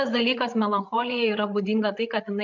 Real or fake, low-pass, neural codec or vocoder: fake; 7.2 kHz; codec, 16 kHz, 8 kbps, FreqCodec, larger model